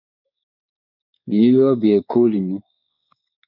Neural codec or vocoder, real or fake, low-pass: codec, 16 kHz, 4 kbps, X-Codec, WavLM features, trained on Multilingual LibriSpeech; fake; 5.4 kHz